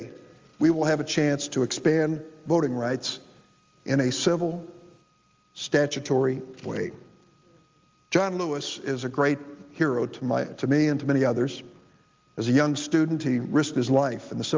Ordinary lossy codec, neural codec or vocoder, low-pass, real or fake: Opus, 32 kbps; none; 7.2 kHz; real